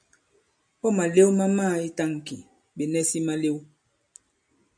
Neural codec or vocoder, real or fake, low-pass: none; real; 9.9 kHz